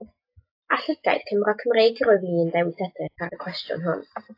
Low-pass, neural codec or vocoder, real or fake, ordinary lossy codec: 5.4 kHz; none; real; AAC, 32 kbps